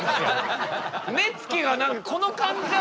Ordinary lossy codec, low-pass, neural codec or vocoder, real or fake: none; none; none; real